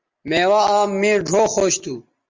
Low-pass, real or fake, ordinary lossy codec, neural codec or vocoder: 7.2 kHz; real; Opus, 32 kbps; none